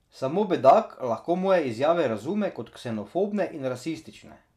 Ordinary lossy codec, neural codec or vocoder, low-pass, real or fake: none; none; 14.4 kHz; real